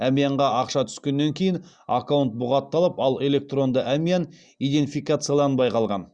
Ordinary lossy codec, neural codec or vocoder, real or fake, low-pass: Opus, 64 kbps; none; real; 9.9 kHz